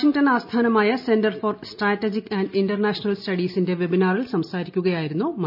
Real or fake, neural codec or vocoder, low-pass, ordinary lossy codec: real; none; 5.4 kHz; none